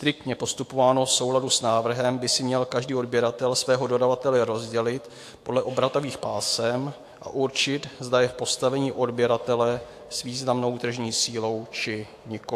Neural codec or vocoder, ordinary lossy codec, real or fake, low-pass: autoencoder, 48 kHz, 128 numbers a frame, DAC-VAE, trained on Japanese speech; AAC, 64 kbps; fake; 14.4 kHz